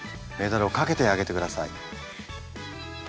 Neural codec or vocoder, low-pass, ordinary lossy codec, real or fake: none; none; none; real